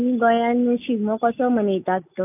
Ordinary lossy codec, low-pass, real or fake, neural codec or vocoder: AAC, 32 kbps; 3.6 kHz; real; none